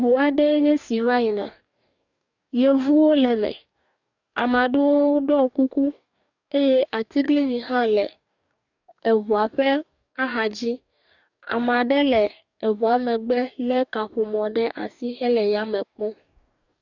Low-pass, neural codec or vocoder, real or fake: 7.2 kHz; codec, 44.1 kHz, 2.6 kbps, DAC; fake